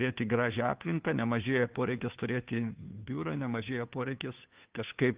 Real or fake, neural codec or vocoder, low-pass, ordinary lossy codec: fake; codec, 16 kHz, 2 kbps, FunCodec, trained on Chinese and English, 25 frames a second; 3.6 kHz; Opus, 32 kbps